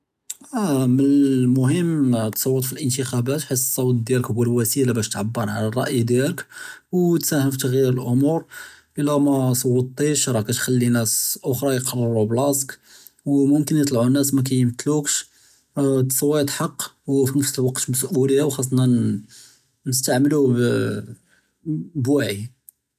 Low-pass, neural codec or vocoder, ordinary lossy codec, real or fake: 14.4 kHz; none; none; real